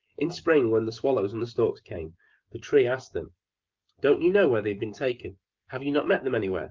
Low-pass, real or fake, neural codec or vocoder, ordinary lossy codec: 7.2 kHz; fake; codec, 16 kHz, 16 kbps, FreqCodec, smaller model; Opus, 32 kbps